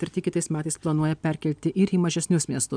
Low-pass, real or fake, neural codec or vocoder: 9.9 kHz; real; none